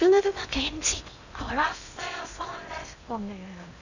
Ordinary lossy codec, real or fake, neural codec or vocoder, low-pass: none; fake; codec, 16 kHz in and 24 kHz out, 0.6 kbps, FocalCodec, streaming, 2048 codes; 7.2 kHz